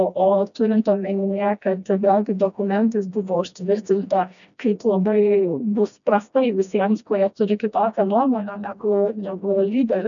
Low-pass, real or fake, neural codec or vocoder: 7.2 kHz; fake; codec, 16 kHz, 1 kbps, FreqCodec, smaller model